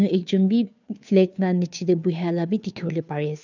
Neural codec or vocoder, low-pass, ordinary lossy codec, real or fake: codec, 16 kHz, 2 kbps, FunCodec, trained on Chinese and English, 25 frames a second; 7.2 kHz; none; fake